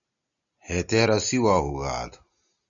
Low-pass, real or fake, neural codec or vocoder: 7.2 kHz; real; none